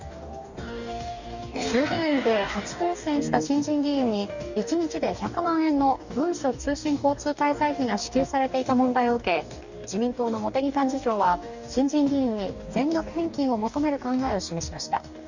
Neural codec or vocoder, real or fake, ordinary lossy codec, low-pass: codec, 44.1 kHz, 2.6 kbps, DAC; fake; none; 7.2 kHz